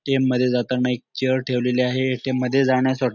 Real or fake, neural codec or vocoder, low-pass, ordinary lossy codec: real; none; 7.2 kHz; none